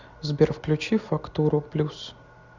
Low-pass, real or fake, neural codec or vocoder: 7.2 kHz; real; none